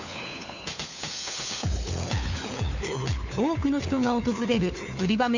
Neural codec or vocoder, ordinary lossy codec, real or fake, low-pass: codec, 16 kHz, 4 kbps, FunCodec, trained on LibriTTS, 50 frames a second; none; fake; 7.2 kHz